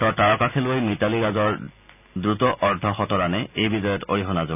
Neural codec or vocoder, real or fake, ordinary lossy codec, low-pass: none; real; none; 3.6 kHz